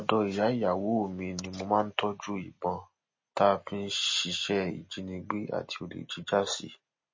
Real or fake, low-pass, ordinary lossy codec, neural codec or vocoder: real; 7.2 kHz; MP3, 32 kbps; none